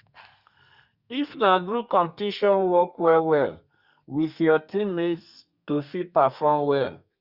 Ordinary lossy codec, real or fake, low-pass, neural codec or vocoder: Opus, 64 kbps; fake; 5.4 kHz; codec, 32 kHz, 1.9 kbps, SNAC